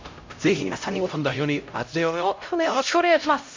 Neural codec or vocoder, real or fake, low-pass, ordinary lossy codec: codec, 16 kHz, 0.5 kbps, X-Codec, HuBERT features, trained on LibriSpeech; fake; 7.2 kHz; MP3, 48 kbps